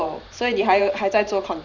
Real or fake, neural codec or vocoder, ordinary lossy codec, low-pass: fake; vocoder, 44.1 kHz, 128 mel bands every 512 samples, BigVGAN v2; none; 7.2 kHz